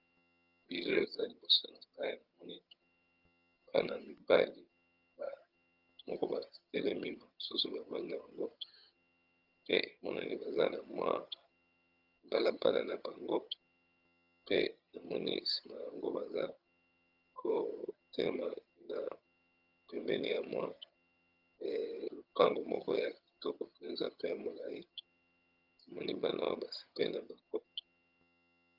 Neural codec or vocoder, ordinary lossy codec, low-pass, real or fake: vocoder, 22.05 kHz, 80 mel bands, HiFi-GAN; Opus, 24 kbps; 5.4 kHz; fake